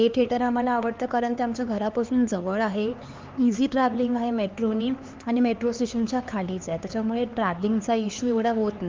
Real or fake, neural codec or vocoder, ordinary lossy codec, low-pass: fake; codec, 16 kHz, 4 kbps, X-Codec, HuBERT features, trained on LibriSpeech; Opus, 24 kbps; 7.2 kHz